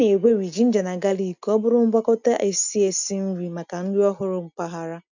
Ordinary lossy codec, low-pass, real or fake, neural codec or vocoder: none; 7.2 kHz; real; none